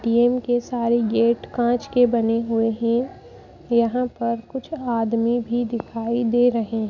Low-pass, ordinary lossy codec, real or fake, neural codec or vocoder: 7.2 kHz; none; real; none